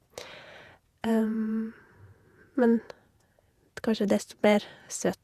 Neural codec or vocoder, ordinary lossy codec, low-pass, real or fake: vocoder, 48 kHz, 128 mel bands, Vocos; Opus, 64 kbps; 14.4 kHz; fake